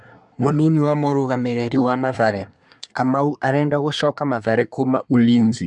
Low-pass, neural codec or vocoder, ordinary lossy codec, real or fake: 10.8 kHz; codec, 24 kHz, 1 kbps, SNAC; none; fake